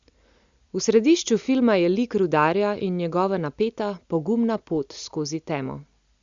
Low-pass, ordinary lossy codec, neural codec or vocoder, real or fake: 7.2 kHz; Opus, 64 kbps; none; real